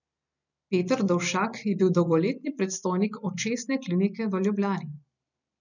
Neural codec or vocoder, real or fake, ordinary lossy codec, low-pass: none; real; none; 7.2 kHz